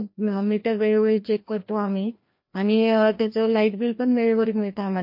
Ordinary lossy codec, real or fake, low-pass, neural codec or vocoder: MP3, 32 kbps; fake; 5.4 kHz; codec, 16 kHz, 1 kbps, FreqCodec, larger model